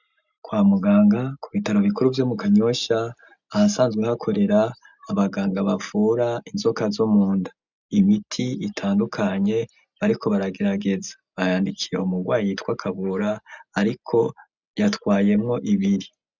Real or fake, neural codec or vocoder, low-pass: real; none; 7.2 kHz